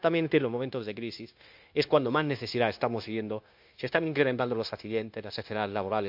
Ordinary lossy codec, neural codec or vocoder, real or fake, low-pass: MP3, 48 kbps; codec, 16 kHz, 0.9 kbps, LongCat-Audio-Codec; fake; 5.4 kHz